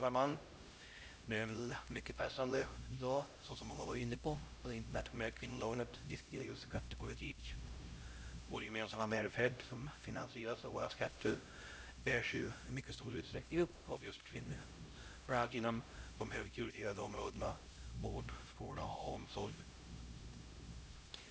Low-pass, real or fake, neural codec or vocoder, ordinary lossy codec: none; fake; codec, 16 kHz, 0.5 kbps, X-Codec, HuBERT features, trained on LibriSpeech; none